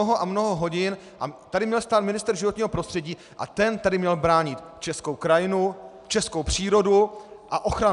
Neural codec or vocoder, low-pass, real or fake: none; 10.8 kHz; real